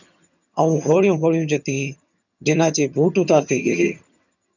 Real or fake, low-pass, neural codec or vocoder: fake; 7.2 kHz; vocoder, 22.05 kHz, 80 mel bands, HiFi-GAN